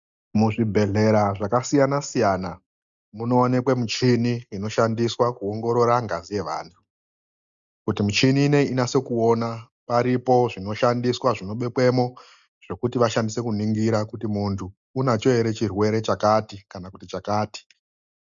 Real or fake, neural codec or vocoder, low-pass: real; none; 7.2 kHz